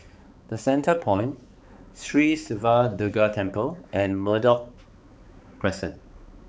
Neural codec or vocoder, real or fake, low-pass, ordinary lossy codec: codec, 16 kHz, 4 kbps, X-Codec, HuBERT features, trained on balanced general audio; fake; none; none